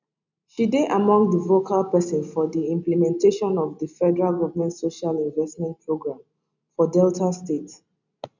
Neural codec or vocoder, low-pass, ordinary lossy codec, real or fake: none; 7.2 kHz; none; real